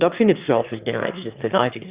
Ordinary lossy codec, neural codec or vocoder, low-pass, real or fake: Opus, 64 kbps; autoencoder, 22.05 kHz, a latent of 192 numbers a frame, VITS, trained on one speaker; 3.6 kHz; fake